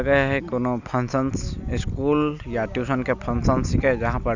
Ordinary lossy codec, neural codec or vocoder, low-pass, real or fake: none; none; 7.2 kHz; real